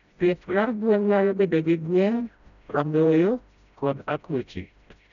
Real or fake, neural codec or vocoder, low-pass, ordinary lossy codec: fake; codec, 16 kHz, 0.5 kbps, FreqCodec, smaller model; 7.2 kHz; none